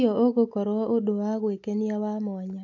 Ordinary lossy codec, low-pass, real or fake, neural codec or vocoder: AAC, 48 kbps; 7.2 kHz; real; none